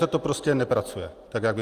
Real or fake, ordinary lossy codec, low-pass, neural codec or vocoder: real; Opus, 32 kbps; 14.4 kHz; none